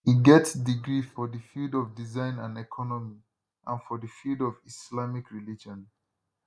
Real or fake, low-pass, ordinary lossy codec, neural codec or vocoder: real; none; none; none